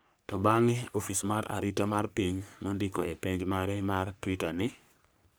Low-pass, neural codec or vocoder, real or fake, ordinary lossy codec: none; codec, 44.1 kHz, 3.4 kbps, Pupu-Codec; fake; none